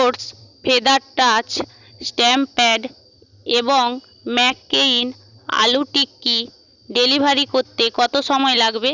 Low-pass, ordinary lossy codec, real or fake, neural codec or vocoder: 7.2 kHz; none; real; none